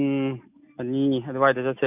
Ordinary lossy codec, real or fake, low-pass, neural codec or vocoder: none; real; 3.6 kHz; none